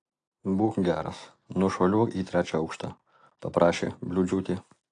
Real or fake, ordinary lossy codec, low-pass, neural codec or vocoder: real; AAC, 48 kbps; 9.9 kHz; none